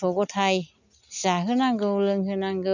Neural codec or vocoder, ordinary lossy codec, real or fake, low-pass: none; none; real; 7.2 kHz